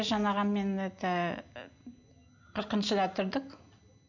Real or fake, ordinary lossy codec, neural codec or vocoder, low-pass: real; none; none; 7.2 kHz